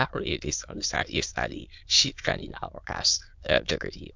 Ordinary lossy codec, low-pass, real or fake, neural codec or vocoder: MP3, 64 kbps; 7.2 kHz; fake; autoencoder, 22.05 kHz, a latent of 192 numbers a frame, VITS, trained on many speakers